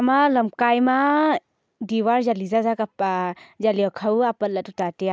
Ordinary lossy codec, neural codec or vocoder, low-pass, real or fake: none; none; none; real